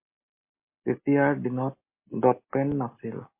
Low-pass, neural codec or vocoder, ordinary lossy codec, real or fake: 3.6 kHz; none; MP3, 24 kbps; real